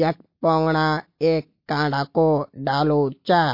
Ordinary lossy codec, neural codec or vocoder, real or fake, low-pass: MP3, 32 kbps; none; real; 5.4 kHz